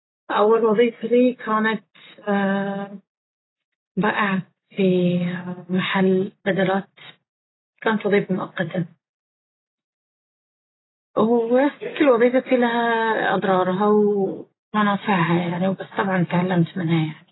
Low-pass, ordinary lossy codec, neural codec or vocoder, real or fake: 7.2 kHz; AAC, 16 kbps; none; real